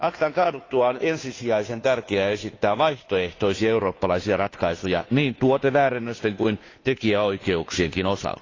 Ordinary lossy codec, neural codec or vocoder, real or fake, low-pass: AAC, 32 kbps; codec, 16 kHz, 2 kbps, FunCodec, trained on Chinese and English, 25 frames a second; fake; 7.2 kHz